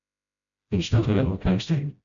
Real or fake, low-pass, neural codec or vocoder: fake; 7.2 kHz; codec, 16 kHz, 0.5 kbps, FreqCodec, smaller model